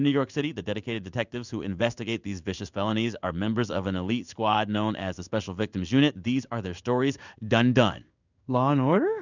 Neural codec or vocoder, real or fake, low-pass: codec, 16 kHz in and 24 kHz out, 1 kbps, XY-Tokenizer; fake; 7.2 kHz